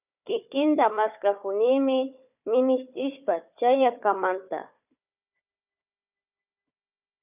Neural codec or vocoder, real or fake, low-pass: codec, 16 kHz, 4 kbps, FunCodec, trained on Chinese and English, 50 frames a second; fake; 3.6 kHz